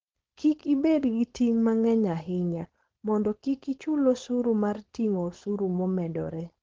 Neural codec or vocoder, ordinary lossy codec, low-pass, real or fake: codec, 16 kHz, 4.8 kbps, FACodec; Opus, 16 kbps; 7.2 kHz; fake